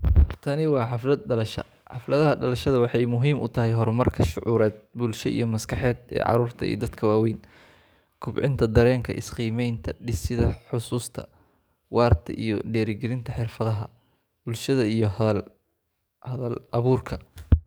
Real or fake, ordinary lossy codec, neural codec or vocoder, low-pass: fake; none; codec, 44.1 kHz, 7.8 kbps, DAC; none